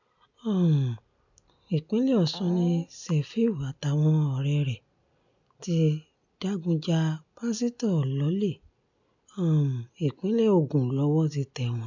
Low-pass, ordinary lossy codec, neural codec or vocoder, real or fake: 7.2 kHz; none; none; real